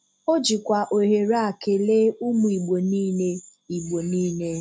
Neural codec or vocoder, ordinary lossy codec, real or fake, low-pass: none; none; real; none